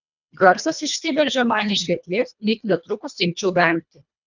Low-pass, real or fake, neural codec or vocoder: 7.2 kHz; fake; codec, 24 kHz, 1.5 kbps, HILCodec